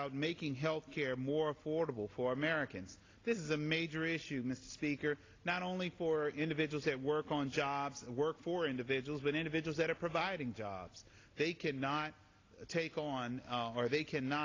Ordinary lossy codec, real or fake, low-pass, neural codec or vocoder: AAC, 32 kbps; real; 7.2 kHz; none